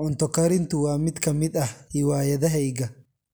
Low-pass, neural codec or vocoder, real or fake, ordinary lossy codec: none; none; real; none